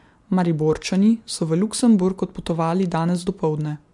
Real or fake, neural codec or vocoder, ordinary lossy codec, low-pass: real; none; MP3, 64 kbps; 10.8 kHz